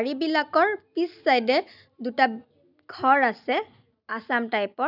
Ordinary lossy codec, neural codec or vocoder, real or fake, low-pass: none; none; real; 5.4 kHz